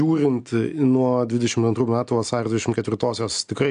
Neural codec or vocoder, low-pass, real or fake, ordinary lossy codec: none; 9.9 kHz; real; AAC, 64 kbps